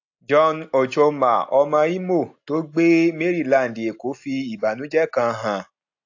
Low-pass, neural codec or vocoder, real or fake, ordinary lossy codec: 7.2 kHz; none; real; AAC, 48 kbps